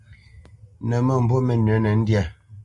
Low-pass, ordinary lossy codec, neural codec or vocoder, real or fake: 10.8 kHz; Opus, 64 kbps; none; real